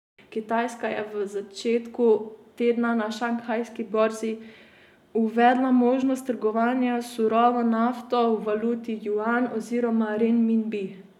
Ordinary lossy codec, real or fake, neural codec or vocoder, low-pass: none; real; none; 19.8 kHz